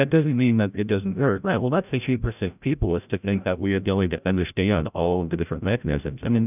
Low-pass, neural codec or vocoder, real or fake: 3.6 kHz; codec, 16 kHz, 0.5 kbps, FreqCodec, larger model; fake